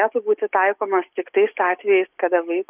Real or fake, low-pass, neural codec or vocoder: real; 3.6 kHz; none